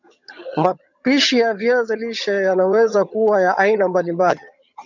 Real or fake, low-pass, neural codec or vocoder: fake; 7.2 kHz; vocoder, 22.05 kHz, 80 mel bands, HiFi-GAN